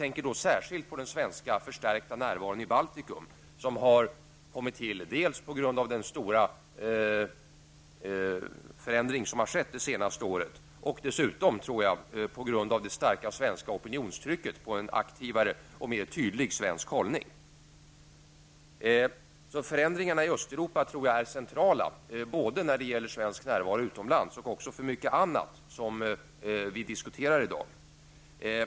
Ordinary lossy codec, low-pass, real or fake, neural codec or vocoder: none; none; real; none